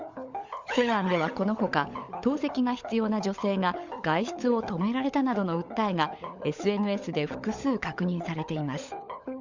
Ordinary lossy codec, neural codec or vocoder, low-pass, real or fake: Opus, 64 kbps; codec, 16 kHz, 16 kbps, FunCodec, trained on LibriTTS, 50 frames a second; 7.2 kHz; fake